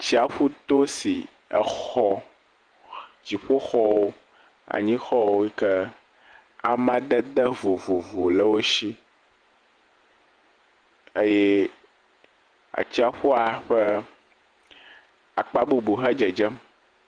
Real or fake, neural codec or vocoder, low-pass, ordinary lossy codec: fake; vocoder, 48 kHz, 128 mel bands, Vocos; 9.9 kHz; Opus, 24 kbps